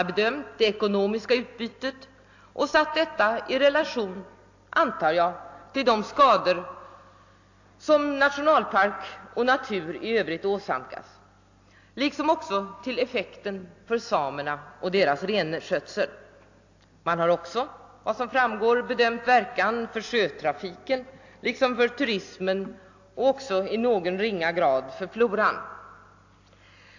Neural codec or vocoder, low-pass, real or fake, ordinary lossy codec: none; 7.2 kHz; real; AAC, 48 kbps